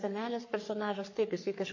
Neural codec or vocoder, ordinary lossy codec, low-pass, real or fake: codec, 44.1 kHz, 3.4 kbps, Pupu-Codec; MP3, 32 kbps; 7.2 kHz; fake